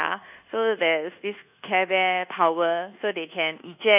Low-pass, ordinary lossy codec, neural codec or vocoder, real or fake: 3.6 kHz; none; codec, 24 kHz, 1.2 kbps, DualCodec; fake